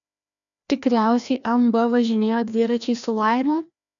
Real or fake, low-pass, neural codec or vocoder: fake; 7.2 kHz; codec, 16 kHz, 1 kbps, FreqCodec, larger model